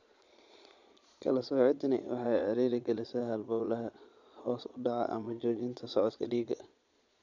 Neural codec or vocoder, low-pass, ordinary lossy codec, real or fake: vocoder, 22.05 kHz, 80 mel bands, Vocos; 7.2 kHz; none; fake